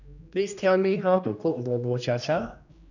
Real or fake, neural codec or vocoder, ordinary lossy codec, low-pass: fake; codec, 16 kHz, 1 kbps, X-Codec, HuBERT features, trained on general audio; none; 7.2 kHz